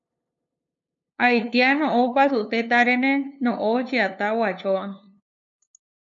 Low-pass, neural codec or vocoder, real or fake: 7.2 kHz; codec, 16 kHz, 2 kbps, FunCodec, trained on LibriTTS, 25 frames a second; fake